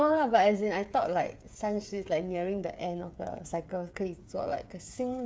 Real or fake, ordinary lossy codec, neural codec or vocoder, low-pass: fake; none; codec, 16 kHz, 8 kbps, FreqCodec, smaller model; none